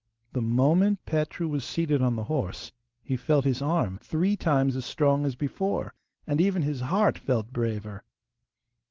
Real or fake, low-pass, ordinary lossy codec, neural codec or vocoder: real; 7.2 kHz; Opus, 16 kbps; none